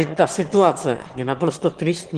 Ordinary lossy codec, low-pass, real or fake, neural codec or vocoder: Opus, 16 kbps; 9.9 kHz; fake; autoencoder, 22.05 kHz, a latent of 192 numbers a frame, VITS, trained on one speaker